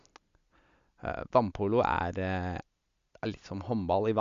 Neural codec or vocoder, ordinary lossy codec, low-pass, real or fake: none; Opus, 64 kbps; 7.2 kHz; real